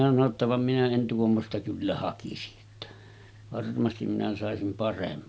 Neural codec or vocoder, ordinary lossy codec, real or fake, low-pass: none; none; real; none